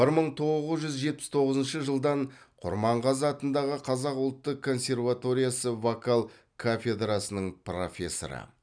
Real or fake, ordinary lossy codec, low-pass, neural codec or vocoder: real; none; 9.9 kHz; none